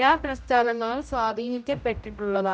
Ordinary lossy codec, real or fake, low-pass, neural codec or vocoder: none; fake; none; codec, 16 kHz, 0.5 kbps, X-Codec, HuBERT features, trained on general audio